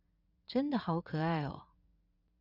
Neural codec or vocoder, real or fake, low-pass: vocoder, 44.1 kHz, 80 mel bands, Vocos; fake; 5.4 kHz